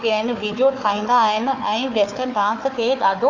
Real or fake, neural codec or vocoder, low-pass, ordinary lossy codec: fake; codec, 16 kHz, 4 kbps, FunCodec, trained on Chinese and English, 50 frames a second; 7.2 kHz; AAC, 48 kbps